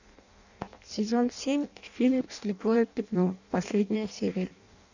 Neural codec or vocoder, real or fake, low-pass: codec, 16 kHz in and 24 kHz out, 0.6 kbps, FireRedTTS-2 codec; fake; 7.2 kHz